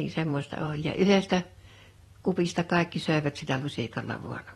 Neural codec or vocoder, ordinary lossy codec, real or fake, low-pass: vocoder, 44.1 kHz, 128 mel bands every 256 samples, BigVGAN v2; AAC, 32 kbps; fake; 19.8 kHz